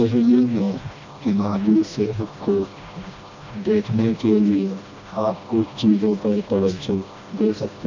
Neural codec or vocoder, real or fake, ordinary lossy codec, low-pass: codec, 16 kHz, 1 kbps, FreqCodec, smaller model; fake; MP3, 64 kbps; 7.2 kHz